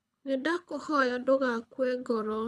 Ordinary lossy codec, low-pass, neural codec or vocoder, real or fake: none; none; codec, 24 kHz, 6 kbps, HILCodec; fake